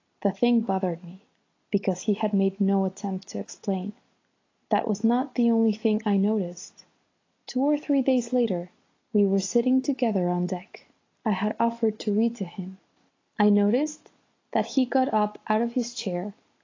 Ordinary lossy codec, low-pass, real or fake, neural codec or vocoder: AAC, 32 kbps; 7.2 kHz; real; none